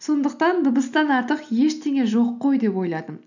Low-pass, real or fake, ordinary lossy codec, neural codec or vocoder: 7.2 kHz; real; none; none